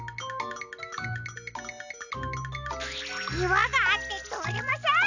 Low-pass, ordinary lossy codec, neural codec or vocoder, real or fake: 7.2 kHz; none; none; real